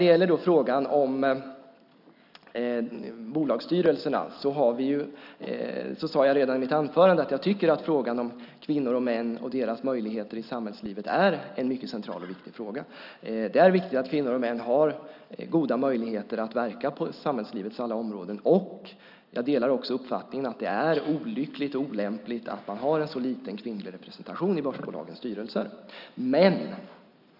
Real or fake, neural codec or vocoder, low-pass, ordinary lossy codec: real; none; 5.4 kHz; none